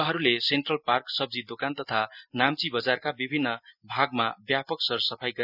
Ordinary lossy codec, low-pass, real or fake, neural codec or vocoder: none; 5.4 kHz; real; none